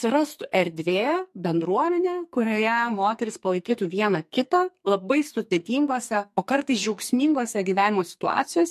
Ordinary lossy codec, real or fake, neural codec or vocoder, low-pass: MP3, 64 kbps; fake; codec, 44.1 kHz, 2.6 kbps, SNAC; 14.4 kHz